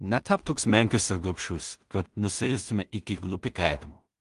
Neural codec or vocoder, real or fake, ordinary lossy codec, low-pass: codec, 16 kHz in and 24 kHz out, 0.4 kbps, LongCat-Audio-Codec, two codebook decoder; fake; Opus, 32 kbps; 10.8 kHz